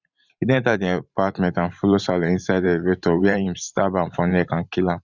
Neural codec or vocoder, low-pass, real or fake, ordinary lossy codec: vocoder, 44.1 kHz, 128 mel bands every 512 samples, BigVGAN v2; 7.2 kHz; fake; Opus, 64 kbps